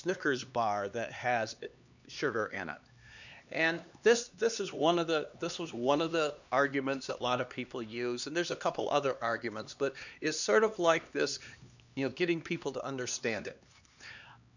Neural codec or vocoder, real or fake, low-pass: codec, 16 kHz, 2 kbps, X-Codec, HuBERT features, trained on LibriSpeech; fake; 7.2 kHz